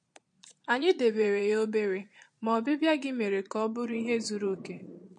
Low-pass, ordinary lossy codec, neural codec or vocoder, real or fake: 9.9 kHz; MP3, 48 kbps; vocoder, 22.05 kHz, 80 mel bands, Vocos; fake